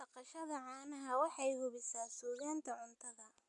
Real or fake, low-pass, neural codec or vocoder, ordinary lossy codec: real; 10.8 kHz; none; none